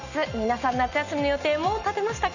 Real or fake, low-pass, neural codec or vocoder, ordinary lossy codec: real; 7.2 kHz; none; none